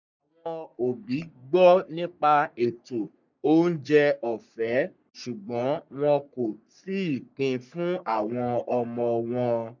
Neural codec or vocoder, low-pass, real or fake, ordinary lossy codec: codec, 44.1 kHz, 3.4 kbps, Pupu-Codec; 7.2 kHz; fake; none